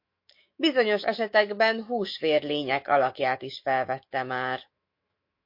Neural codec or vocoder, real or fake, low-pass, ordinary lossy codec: autoencoder, 48 kHz, 128 numbers a frame, DAC-VAE, trained on Japanese speech; fake; 5.4 kHz; MP3, 32 kbps